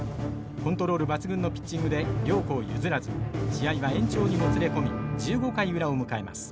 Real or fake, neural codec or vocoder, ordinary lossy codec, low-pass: real; none; none; none